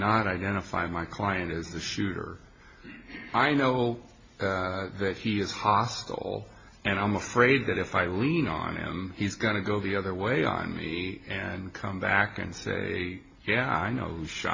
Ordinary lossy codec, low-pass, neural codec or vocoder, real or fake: MP3, 32 kbps; 7.2 kHz; none; real